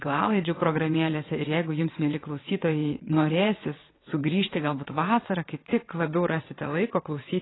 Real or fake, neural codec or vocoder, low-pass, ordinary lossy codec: fake; vocoder, 22.05 kHz, 80 mel bands, WaveNeXt; 7.2 kHz; AAC, 16 kbps